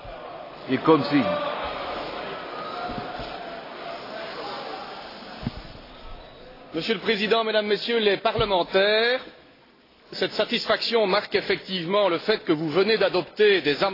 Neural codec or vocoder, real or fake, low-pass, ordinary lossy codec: none; real; 5.4 kHz; AAC, 24 kbps